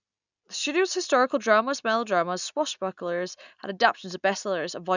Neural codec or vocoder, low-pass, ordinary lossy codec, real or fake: none; 7.2 kHz; none; real